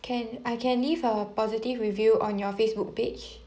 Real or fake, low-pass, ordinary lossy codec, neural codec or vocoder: real; none; none; none